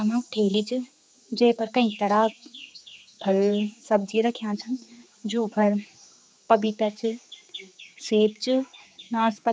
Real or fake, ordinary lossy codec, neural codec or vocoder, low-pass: fake; none; codec, 16 kHz, 4 kbps, X-Codec, HuBERT features, trained on general audio; none